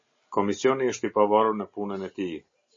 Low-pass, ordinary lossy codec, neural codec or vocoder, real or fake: 7.2 kHz; MP3, 32 kbps; none; real